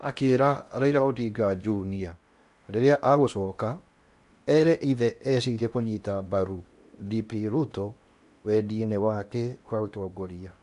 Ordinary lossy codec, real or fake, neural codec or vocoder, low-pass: MP3, 64 kbps; fake; codec, 16 kHz in and 24 kHz out, 0.8 kbps, FocalCodec, streaming, 65536 codes; 10.8 kHz